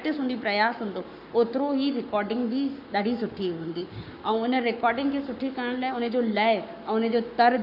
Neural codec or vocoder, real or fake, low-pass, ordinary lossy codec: codec, 44.1 kHz, 7.8 kbps, Pupu-Codec; fake; 5.4 kHz; none